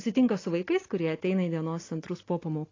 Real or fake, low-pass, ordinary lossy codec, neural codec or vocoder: real; 7.2 kHz; AAC, 32 kbps; none